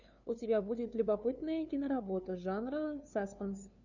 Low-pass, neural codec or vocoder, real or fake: 7.2 kHz; codec, 16 kHz, 2 kbps, FunCodec, trained on LibriTTS, 25 frames a second; fake